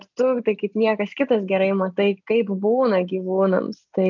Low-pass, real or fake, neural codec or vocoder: 7.2 kHz; real; none